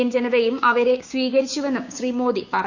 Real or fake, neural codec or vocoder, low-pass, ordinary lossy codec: fake; codec, 16 kHz, 6 kbps, DAC; 7.2 kHz; none